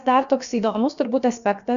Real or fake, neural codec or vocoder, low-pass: fake; codec, 16 kHz, about 1 kbps, DyCAST, with the encoder's durations; 7.2 kHz